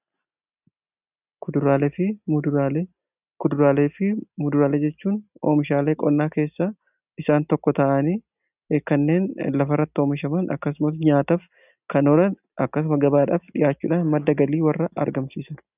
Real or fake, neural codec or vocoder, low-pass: real; none; 3.6 kHz